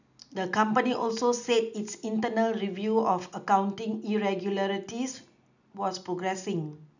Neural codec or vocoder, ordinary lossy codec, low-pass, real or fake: none; none; 7.2 kHz; real